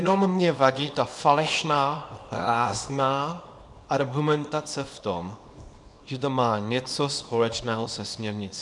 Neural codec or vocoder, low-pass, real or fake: codec, 24 kHz, 0.9 kbps, WavTokenizer, small release; 10.8 kHz; fake